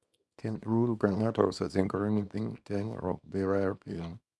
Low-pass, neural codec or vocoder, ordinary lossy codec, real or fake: none; codec, 24 kHz, 0.9 kbps, WavTokenizer, small release; none; fake